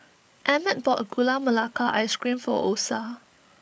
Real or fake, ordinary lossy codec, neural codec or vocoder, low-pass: fake; none; codec, 16 kHz, 8 kbps, FreqCodec, larger model; none